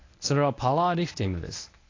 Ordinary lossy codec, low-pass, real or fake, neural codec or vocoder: AAC, 32 kbps; 7.2 kHz; fake; codec, 24 kHz, 0.9 kbps, WavTokenizer, medium speech release version 1